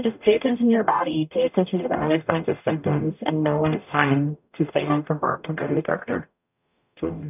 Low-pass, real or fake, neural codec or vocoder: 3.6 kHz; fake; codec, 44.1 kHz, 0.9 kbps, DAC